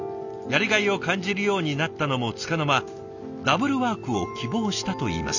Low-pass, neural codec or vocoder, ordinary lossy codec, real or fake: 7.2 kHz; none; none; real